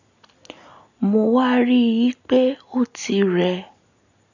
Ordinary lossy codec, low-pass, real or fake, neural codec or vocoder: none; 7.2 kHz; real; none